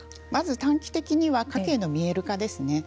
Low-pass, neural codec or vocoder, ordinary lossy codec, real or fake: none; none; none; real